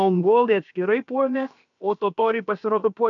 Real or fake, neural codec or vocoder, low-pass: fake; codec, 16 kHz, about 1 kbps, DyCAST, with the encoder's durations; 7.2 kHz